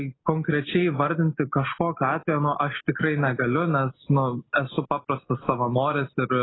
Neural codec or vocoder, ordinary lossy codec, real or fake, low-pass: none; AAC, 16 kbps; real; 7.2 kHz